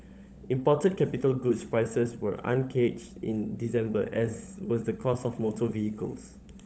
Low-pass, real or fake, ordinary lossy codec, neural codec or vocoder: none; fake; none; codec, 16 kHz, 16 kbps, FunCodec, trained on LibriTTS, 50 frames a second